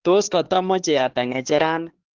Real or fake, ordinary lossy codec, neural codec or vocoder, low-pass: fake; Opus, 16 kbps; codec, 16 kHz, 2 kbps, X-Codec, HuBERT features, trained on LibriSpeech; 7.2 kHz